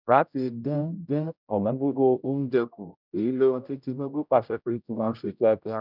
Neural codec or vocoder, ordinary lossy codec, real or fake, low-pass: codec, 16 kHz, 0.5 kbps, X-Codec, HuBERT features, trained on general audio; none; fake; 5.4 kHz